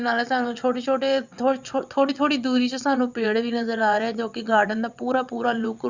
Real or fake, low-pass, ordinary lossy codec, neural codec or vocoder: fake; 7.2 kHz; Opus, 64 kbps; vocoder, 22.05 kHz, 80 mel bands, WaveNeXt